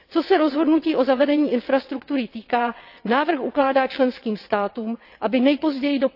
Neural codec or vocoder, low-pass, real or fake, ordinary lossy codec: vocoder, 22.05 kHz, 80 mel bands, WaveNeXt; 5.4 kHz; fake; AAC, 48 kbps